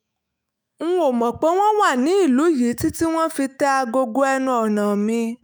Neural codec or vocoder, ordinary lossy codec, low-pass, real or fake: autoencoder, 48 kHz, 128 numbers a frame, DAC-VAE, trained on Japanese speech; none; none; fake